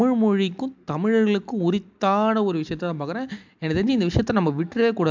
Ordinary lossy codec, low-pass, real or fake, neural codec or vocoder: MP3, 64 kbps; 7.2 kHz; real; none